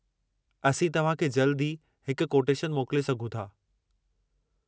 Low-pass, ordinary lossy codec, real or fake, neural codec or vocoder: none; none; real; none